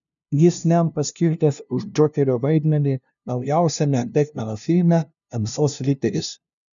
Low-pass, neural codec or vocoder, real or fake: 7.2 kHz; codec, 16 kHz, 0.5 kbps, FunCodec, trained on LibriTTS, 25 frames a second; fake